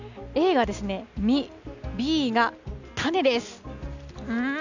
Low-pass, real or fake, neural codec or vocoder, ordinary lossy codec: 7.2 kHz; real; none; none